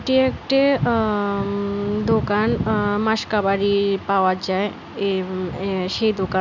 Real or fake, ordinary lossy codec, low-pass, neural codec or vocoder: real; none; 7.2 kHz; none